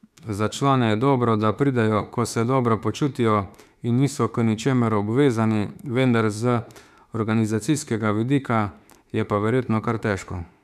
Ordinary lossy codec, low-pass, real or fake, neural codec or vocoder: none; 14.4 kHz; fake; autoencoder, 48 kHz, 32 numbers a frame, DAC-VAE, trained on Japanese speech